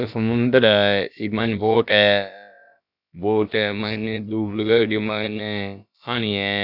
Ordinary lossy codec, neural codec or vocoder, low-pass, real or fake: none; codec, 16 kHz, about 1 kbps, DyCAST, with the encoder's durations; 5.4 kHz; fake